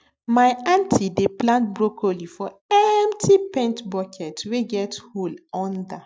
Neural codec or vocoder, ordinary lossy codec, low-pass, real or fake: none; none; none; real